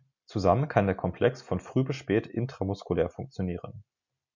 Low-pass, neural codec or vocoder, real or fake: 7.2 kHz; none; real